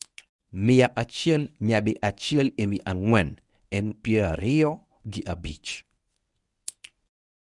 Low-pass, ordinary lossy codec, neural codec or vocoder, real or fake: 10.8 kHz; none; codec, 24 kHz, 0.9 kbps, WavTokenizer, medium speech release version 1; fake